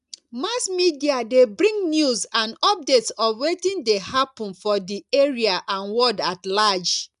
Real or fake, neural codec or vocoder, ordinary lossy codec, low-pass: real; none; none; 10.8 kHz